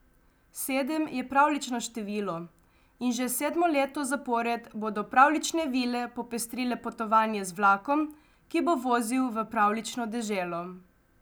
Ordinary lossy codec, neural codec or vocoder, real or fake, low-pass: none; none; real; none